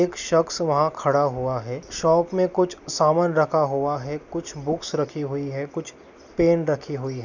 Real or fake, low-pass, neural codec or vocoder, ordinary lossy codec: real; 7.2 kHz; none; none